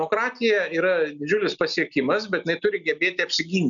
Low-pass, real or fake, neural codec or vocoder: 7.2 kHz; real; none